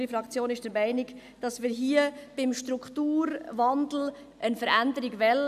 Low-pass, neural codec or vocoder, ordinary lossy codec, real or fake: 14.4 kHz; none; none; real